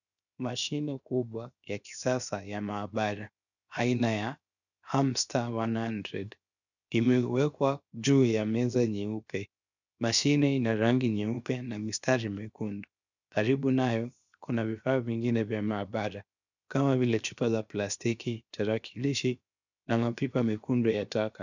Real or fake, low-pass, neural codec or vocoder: fake; 7.2 kHz; codec, 16 kHz, 0.7 kbps, FocalCodec